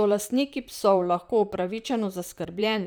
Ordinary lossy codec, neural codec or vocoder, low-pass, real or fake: none; vocoder, 44.1 kHz, 128 mel bands, Pupu-Vocoder; none; fake